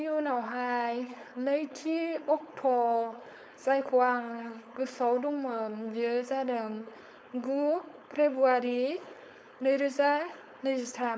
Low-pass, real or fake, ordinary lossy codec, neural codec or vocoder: none; fake; none; codec, 16 kHz, 4.8 kbps, FACodec